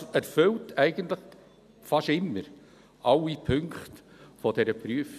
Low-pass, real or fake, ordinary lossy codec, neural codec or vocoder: 14.4 kHz; real; none; none